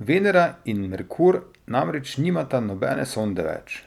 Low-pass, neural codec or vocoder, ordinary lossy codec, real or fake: 19.8 kHz; none; none; real